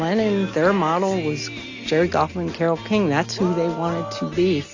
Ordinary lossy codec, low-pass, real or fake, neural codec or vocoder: AAC, 48 kbps; 7.2 kHz; real; none